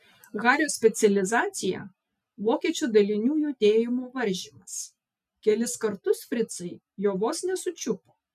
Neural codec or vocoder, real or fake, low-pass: none; real; 14.4 kHz